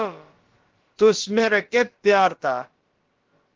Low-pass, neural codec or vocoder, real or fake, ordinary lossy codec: 7.2 kHz; codec, 16 kHz, about 1 kbps, DyCAST, with the encoder's durations; fake; Opus, 16 kbps